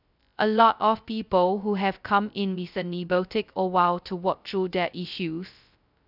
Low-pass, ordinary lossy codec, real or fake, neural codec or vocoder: 5.4 kHz; none; fake; codec, 16 kHz, 0.2 kbps, FocalCodec